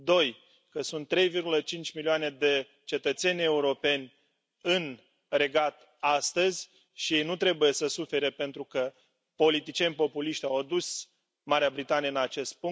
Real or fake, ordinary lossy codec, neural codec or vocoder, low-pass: real; none; none; none